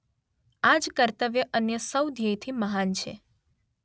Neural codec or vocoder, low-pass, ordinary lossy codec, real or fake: none; none; none; real